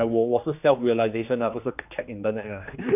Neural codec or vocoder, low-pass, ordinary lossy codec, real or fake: codec, 16 kHz, 2 kbps, X-Codec, HuBERT features, trained on general audio; 3.6 kHz; none; fake